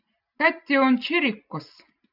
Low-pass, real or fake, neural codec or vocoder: 5.4 kHz; real; none